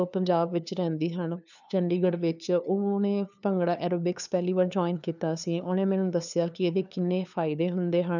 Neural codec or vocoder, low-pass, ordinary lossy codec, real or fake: codec, 16 kHz, 2 kbps, FunCodec, trained on LibriTTS, 25 frames a second; 7.2 kHz; none; fake